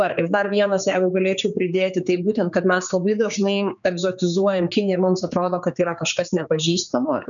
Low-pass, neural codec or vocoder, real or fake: 7.2 kHz; codec, 16 kHz, 4 kbps, X-Codec, HuBERT features, trained on general audio; fake